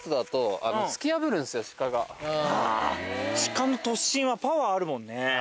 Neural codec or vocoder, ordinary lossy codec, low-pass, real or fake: none; none; none; real